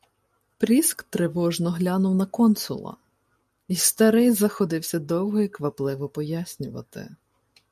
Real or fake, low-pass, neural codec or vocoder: real; 14.4 kHz; none